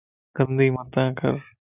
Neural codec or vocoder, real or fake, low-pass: none; real; 3.6 kHz